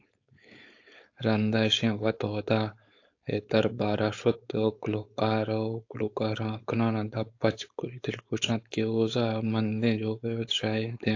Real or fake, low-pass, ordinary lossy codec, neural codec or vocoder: fake; 7.2 kHz; AAC, 48 kbps; codec, 16 kHz, 4.8 kbps, FACodec